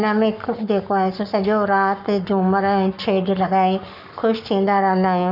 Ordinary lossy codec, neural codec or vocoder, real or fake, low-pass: none; codec, 44.1 kHz, 7.8 kbps, Pupu-Codec; fake; 5.4 kHz